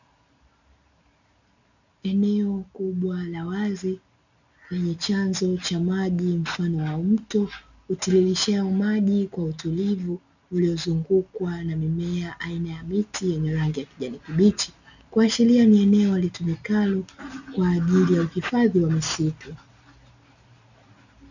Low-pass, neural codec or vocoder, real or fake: 7.2 kHz; none; real